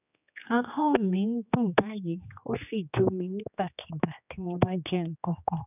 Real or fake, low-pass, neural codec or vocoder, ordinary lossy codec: fake; 3.6 kHz; codec, 16 kHz, 2 kbps, X-Codec, HuBERT features, trained on general audio; none